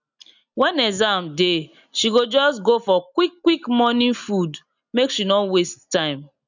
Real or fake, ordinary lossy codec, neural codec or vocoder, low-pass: real; none; none; 7.2 kHz